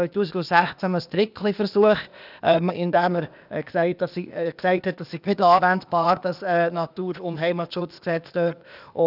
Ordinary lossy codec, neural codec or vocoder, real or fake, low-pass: none; codec, 16 kHz, 0.8 kbps, ZipCodec; fake; 5.4 kHz